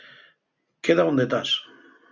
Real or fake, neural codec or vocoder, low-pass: real; none; 7.2 kHz